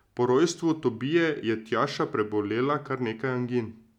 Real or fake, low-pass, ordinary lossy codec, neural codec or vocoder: real; 19.8 kHz; none; none